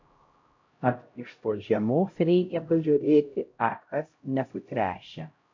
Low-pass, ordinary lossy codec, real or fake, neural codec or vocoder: 7.2 kHz; MP3, 48 kbps; fake; codec, 16 kHz, 0.5 kbps, X-Codec, HuBERT features, trained on LibriSpeech